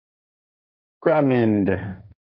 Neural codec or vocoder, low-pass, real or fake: codec, 16 kHz, 1.1 kbps, Voila-Tokenizer; 5.4 kHz; fake